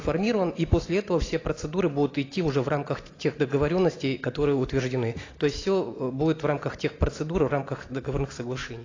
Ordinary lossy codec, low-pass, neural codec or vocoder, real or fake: AAC, 32 kbps; 7.2 kHz; none; real